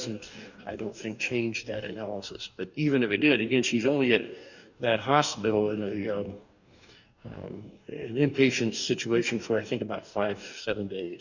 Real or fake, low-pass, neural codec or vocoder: fake; 7.2 kHz; codec, 44.1 kHz, 2.6 kbps, DAC